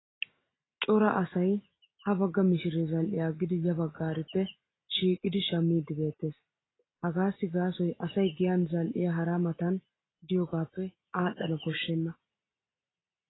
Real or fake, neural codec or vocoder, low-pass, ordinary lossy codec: real; none; 7.2 kHz; AAC, 16 kbps